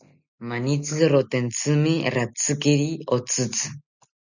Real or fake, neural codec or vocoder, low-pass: real; none; 7.2 kHz